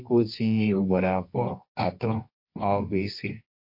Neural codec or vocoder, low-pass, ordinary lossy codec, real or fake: codec, 24 kHz, 0.9 kbps, WavTokenizer, medium music audio release; 5.4 kHz; MP3, 32 kbps; fake